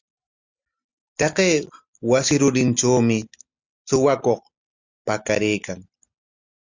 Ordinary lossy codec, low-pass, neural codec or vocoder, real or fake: Opus, 64 kbps; 7.2 kHz; none; real